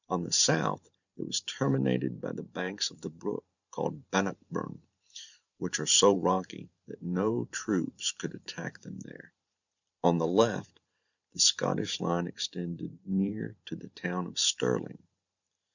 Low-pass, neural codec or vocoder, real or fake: 7.2 kHz; vocoder, 44.1 kHz, 128 mel bands every 512 samples, BigVGAN v2; fake